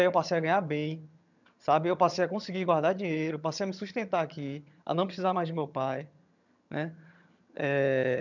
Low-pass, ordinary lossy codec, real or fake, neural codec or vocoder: 7.2 kHz; none; fake; vocoder, 22.05 kHz, 80 mel bands, HiFi-GAN